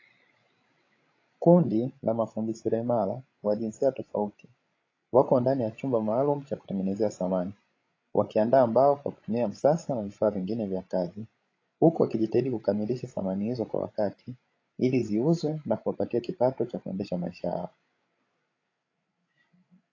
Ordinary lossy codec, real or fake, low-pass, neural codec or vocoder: AAC, 32 kbps; fake; 7.2 kHz; codec, 16 kHz, 16 kbps, FreqCodec, larger model